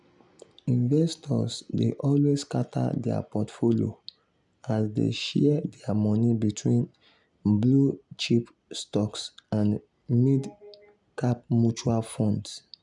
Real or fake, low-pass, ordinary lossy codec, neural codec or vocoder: real; 10.8 kHz; none; none